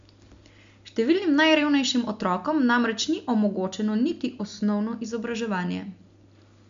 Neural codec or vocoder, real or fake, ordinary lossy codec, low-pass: none; real; MP3, 64 kbps; 7.2 kHz